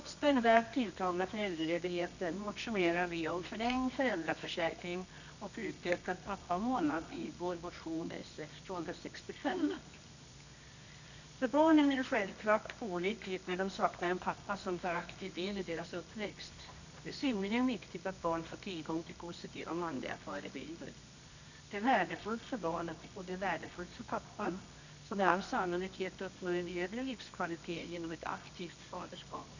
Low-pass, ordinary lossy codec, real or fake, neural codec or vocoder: 7.2 kHz; none; fake; codec, 24 kHz, 0.9 kbps, WavTokenizer, medium music audio release